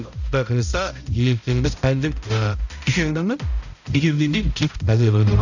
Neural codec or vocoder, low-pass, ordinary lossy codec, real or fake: codec, 16 kHz, 0.5 kbps, X-Codec, HuBERT features, trained on balanced general audio; 7.2 kHz; none; fake